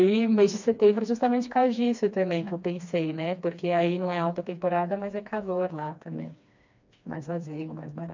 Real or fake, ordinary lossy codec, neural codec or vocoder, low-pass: fake; MP3, 64 kbps; codec, 16 kHz, 2 kbps, FreqCodec, smaller model; 7.2 kHz